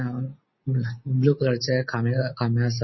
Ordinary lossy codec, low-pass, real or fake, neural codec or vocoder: MP3, 24 kbps; 7.2 kHz; real; none